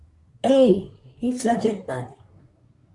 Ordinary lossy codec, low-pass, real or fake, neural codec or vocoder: Opus, 64 kbps; 10.8 kHz; fake; codec, 24 kHz, 1 kbps, SNAC